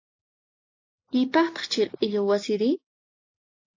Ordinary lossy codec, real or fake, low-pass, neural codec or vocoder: AAC, 48 kbps; real; 7.2 kHz; none